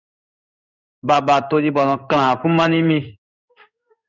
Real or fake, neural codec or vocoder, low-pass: fake; codec, 16 kHz in and 24 kHz out, 1 kbps, XY-Tokenizer; 7.2 kHz